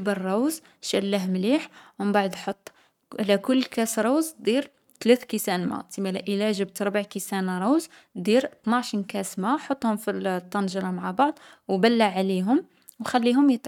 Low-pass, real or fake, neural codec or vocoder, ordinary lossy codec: 19.8 kHz; fake; codec, 44.1 kHz, 7.8 kbps, Pupu-Codec; none